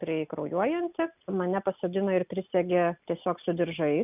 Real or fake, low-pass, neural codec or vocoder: real; 3.6 kHz; none